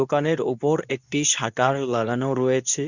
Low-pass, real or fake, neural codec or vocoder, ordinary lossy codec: 7.2 kHz; fake; codec, 24 kHz, 0.9 kbps, WavTokenizer, medium speech release version 2; none